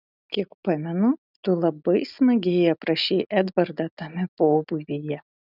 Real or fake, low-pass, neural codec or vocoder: real; 5.4 kHz; none